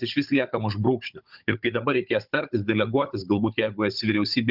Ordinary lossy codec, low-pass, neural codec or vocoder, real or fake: Opus, 64 kbps; 5.4 kHz; codec, 16 kHz, 16 kbps, FunCodec, trained on Chinese and English, 50 frames a second; fake